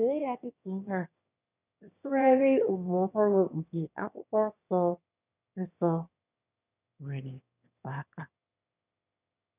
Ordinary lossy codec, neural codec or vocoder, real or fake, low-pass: none; autoencoder, 22.05 kHz, a latent of 192 numbers a frame, VITS, trained on one speaker; fake; 3.6 kHz